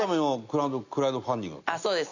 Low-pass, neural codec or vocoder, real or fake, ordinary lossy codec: 7.2 kHz; none; real; none